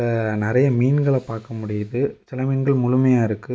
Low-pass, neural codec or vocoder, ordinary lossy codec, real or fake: none; none; none; real